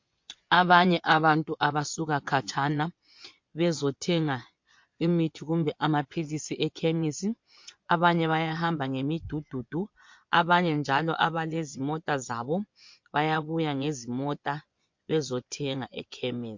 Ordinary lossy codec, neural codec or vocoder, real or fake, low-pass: MP3, 48 kbps; vocoder, 24 kHz, 100 mel bands, Vocos; fake; 7.2 kHz